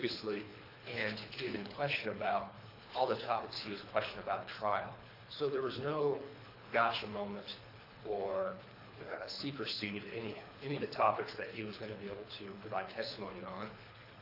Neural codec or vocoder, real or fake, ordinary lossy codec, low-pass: codec, 24 kHz, 3 kbps, HILCodec; fake; AAC, 32 kbps; 5.4 kHz